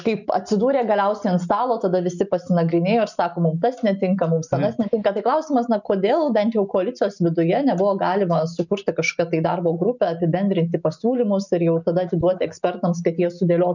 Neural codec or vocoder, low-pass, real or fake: none; 7.2 kHz; real